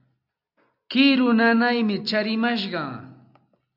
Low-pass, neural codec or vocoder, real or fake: 5.4 kHz; none; real